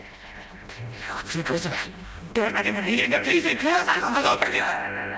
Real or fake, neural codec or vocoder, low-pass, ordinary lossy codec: fake; codec, 16 kHz, 0.5 kbps, FreqCodec, smaller model; none; none